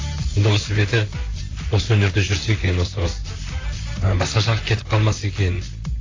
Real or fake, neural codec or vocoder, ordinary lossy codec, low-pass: fake; vocoder, 44.1 kHz, 128 mel bands, Pupu-Vocoder; AAC, 32 kbps; 7.2 kHz